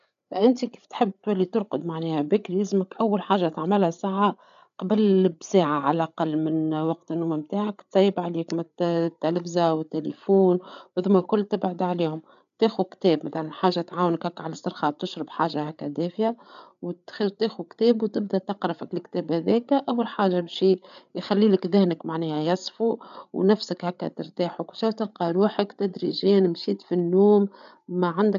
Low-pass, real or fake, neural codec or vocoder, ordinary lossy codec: 7.2 kHz; fake; codec, 16 kHz, 8 kbps, FreqCodec, larger model; none